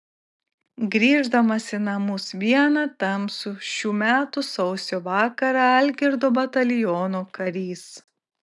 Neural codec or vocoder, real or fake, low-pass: none; real; 10.8 kHz